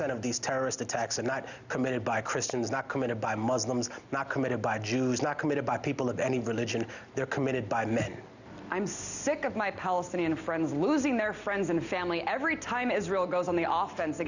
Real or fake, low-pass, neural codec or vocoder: real; 7.2 kHz; none